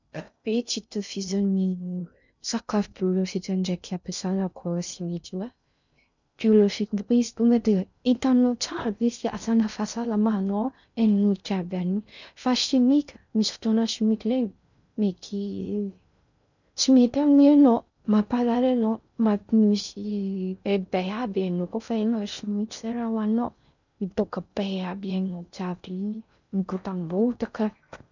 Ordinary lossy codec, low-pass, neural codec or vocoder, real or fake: none; 7.2 kHz; codec, 16 kHz in and 24 kHz out, 0.6 kbps, FocalCodec, streaming, 2048 codes; fake